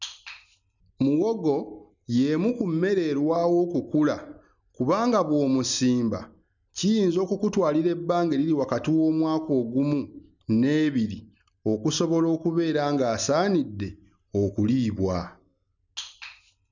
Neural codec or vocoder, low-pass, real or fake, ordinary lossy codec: none; 7.2 kHz; real; none